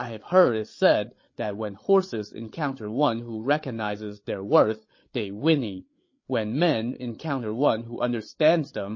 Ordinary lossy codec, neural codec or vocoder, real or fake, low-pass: MP3, 32 kbps; codec, 16 kHz, 8 kbps, FreqCodec, larger model; fake; 7.2 kHz